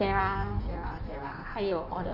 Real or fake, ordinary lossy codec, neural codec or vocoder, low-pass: fake; none; codec, 16 kHz in and 24 kHz out, 1.1 kbps, FireRedTTS-2 codec; 5.4 kHz